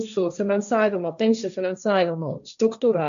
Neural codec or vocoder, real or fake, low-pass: codec, 16 kHz, 1.1 kbps, Voila-Tokenizer; fake; 7.2 kHz